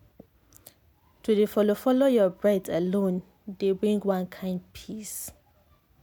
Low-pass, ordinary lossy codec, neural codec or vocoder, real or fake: none; none; none; real